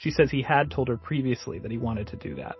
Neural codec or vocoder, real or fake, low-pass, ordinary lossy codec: none; real; 7.2 kHz; MP3, 24 kbps